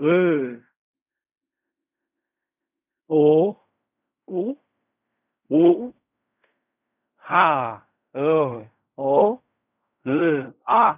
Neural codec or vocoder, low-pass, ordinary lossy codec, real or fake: codec, 16 kHz in and 24 kHz out, 0.4 kbps, LongCat-Audio-Codec, fine tuned four codebook decoder; 3.6 kHz; none; fake